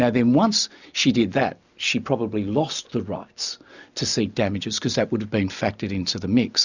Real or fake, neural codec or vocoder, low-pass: real; none; 7.2 kHz